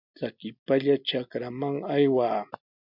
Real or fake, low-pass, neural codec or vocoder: real; 5.4 kHz; none